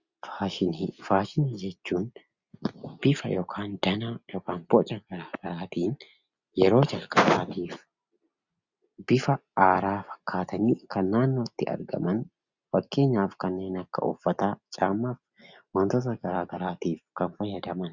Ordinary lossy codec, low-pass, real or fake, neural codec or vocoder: Opus, 64 kbps; 7.2 kHz; real; none